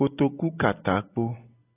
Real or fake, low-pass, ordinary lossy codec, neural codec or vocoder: real; 3.6 kHz; AAC, 16 kbps; none